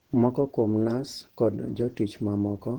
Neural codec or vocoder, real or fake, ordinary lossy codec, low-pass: vocoder, 48 kHz, 128 mel bands, Vocos; fake; Opus, 16 kbps; 19.8 kHz